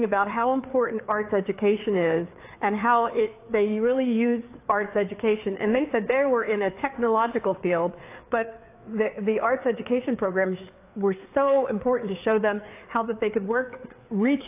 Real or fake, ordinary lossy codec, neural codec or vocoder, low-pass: fake; AAC, 24 kbps; codec, 16 kHz, 4 kbps, FreqCodec, larger model; 3.6 kHz